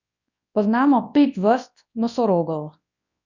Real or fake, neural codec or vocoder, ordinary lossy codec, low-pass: fake; codec, 24 kHz, 0.9 kbps, WavTokenizer, large speech release; none; 7.2 kHz